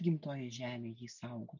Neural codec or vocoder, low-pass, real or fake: none; 7.2 kHz; real